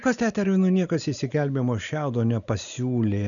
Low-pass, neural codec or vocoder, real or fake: 7.2 kHz; none; real